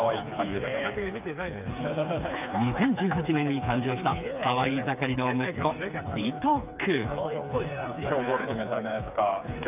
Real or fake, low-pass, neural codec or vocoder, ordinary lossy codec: fake; 3.6 kHz; codec, 16 kHz, 4 kbps, FreqCodec, smaller model; AAC, 32 kbps